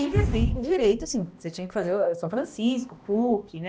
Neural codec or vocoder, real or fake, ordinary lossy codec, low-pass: codec, 16 kHz, 1 kbps, X-Codec, HuBERT features, trained on balanced general audio; fake; none; none